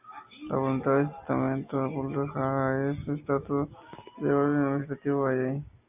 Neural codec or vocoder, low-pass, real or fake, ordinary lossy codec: none; 3.6 kHz; real; AAC, 32 kbps